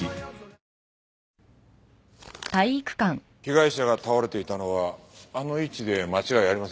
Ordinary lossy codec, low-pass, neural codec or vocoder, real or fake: none; none; none; real